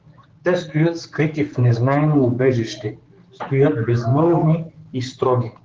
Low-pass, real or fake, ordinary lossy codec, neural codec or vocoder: 7.2 kHz; fake; Opus, 16 kbps; codec, 16 kHz, 4 kbps, X-Codec, HuBERT features, trained on general audio